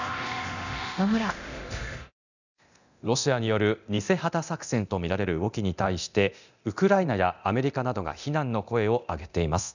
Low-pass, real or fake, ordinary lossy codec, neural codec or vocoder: 7.2 kHz; fake; none; codec, 24 kHz, 0.9 kbps, DualCodec